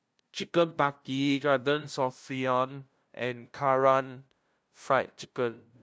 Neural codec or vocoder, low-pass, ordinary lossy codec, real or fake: codec, 16 kHz, 0.5 kbps, FunCodec, trained on LibriTTS, 25 frames a second; none; none; fake